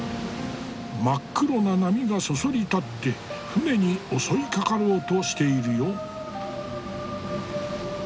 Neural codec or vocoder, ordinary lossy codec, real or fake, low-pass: none; none; real; none